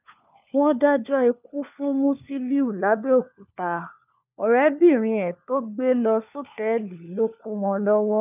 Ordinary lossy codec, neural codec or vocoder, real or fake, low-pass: none; codec, 16 kHz, 4 kbps, FunCodec, trained on LibriTTS, 50 frames a second; fake; 3.6 kHz